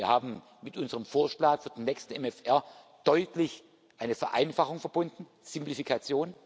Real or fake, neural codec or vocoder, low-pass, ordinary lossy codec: real; none; none; none